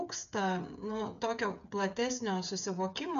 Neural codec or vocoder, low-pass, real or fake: codec, 16 kHz, 8 kbps, FreqCodec, smaller model; 7.2 kHz; fake